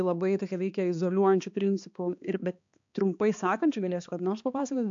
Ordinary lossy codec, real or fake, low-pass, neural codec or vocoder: AAC, 64 kbps; fake; 7.2 kHz; codec, 16 kHz, 2 kbps, X-Codec, HuBERT features, trained on balanced general audio